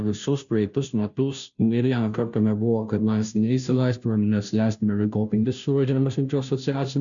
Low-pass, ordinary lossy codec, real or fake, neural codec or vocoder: 7.2 kHz; MP3, 96 kbps; fake; codec, 16 kHz, 0.5 kbps, FunCodec, trained on Chinese and English, 25 frames a second